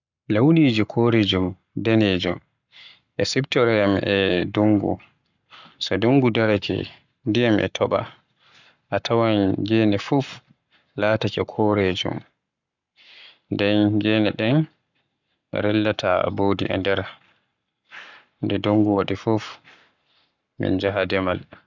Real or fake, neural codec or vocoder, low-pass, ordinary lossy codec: fake; codec, 44.1 kHz, 7.8 kbps, Pupu-Codec; 7.2 kHz; none